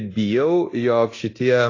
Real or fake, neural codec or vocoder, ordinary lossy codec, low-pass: fake; codec, 24 kHz, 0.9 kbps, DualCodec; AAC, 32 kbps; 7.2 kHz